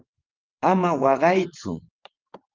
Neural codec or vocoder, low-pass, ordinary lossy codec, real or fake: vocoder, 22.05 kHz, 80 mel bands, WaveNeXt; 7.2 kHz; Opus, 32 kbps; fake